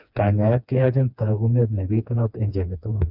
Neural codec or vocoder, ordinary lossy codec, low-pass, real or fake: codec, 16 kHz, 2 kbps, FreqCodec, smaller model; none; 5.4 kHz; fake